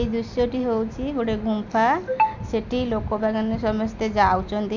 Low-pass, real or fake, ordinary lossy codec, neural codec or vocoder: 7.2 kHz; real; none; none